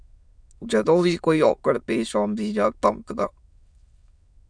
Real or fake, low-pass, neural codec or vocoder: fake; 9.9 kHz; autoencoder, 22.05 kHz, a latent of 192 numbers a frame, VITS, trained on many speakers